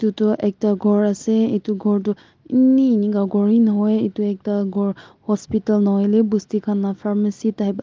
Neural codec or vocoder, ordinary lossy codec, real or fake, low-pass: none; Opus, 32 kbps; real; 7.2 kHz